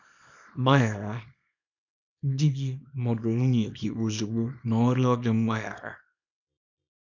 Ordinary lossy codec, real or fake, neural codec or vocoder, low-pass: none; fake; codec, 24 kHz, 0.9 kbps, WavTokenizer, small release; 7.2 kHz